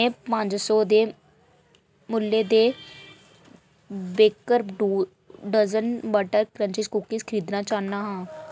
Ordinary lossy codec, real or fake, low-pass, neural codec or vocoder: none; real; none; none